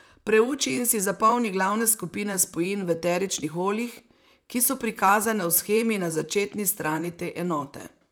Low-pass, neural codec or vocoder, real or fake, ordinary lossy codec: none; vocoder, 44.1 kHz, 128 mel bands, Pupu-Vocoder; fake; none